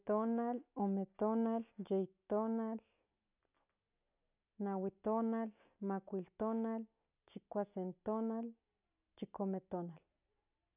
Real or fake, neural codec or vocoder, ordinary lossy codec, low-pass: real; none; none; 3.6 kHz